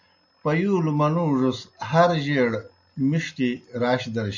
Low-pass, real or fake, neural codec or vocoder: 7.2 kHz; real; none